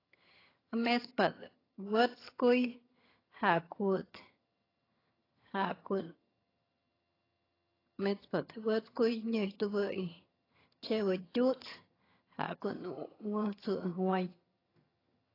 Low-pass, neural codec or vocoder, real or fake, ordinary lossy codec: 5.4 kHz; vocoder, 22.05 kHz, 80 mel bands, HiFi-GAN; fake; AAC, 24 kbps